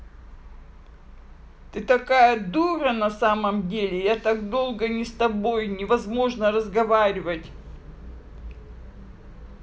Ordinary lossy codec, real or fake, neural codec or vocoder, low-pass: none; real; none; none